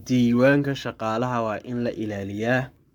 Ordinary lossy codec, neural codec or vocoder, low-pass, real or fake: none; codec, 44.1 kHz, 7.8 kbps, Pupu-Codec; 19.8 kHz; fake